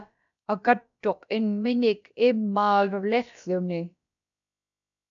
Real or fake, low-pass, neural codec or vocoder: fake; 7.2 kHz; codec, 16 kHz, about 1 kbps, DyCAST, with the encoder's durations